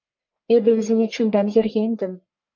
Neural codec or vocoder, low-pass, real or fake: codec, 44.1 kHz, 1.7 kbps, Pupu-Codec; 7.2 kHz; fake